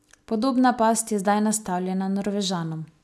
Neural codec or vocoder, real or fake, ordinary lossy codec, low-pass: none; real; none; none